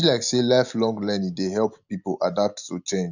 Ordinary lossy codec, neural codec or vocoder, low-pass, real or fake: none; none; 7.2 kHz; real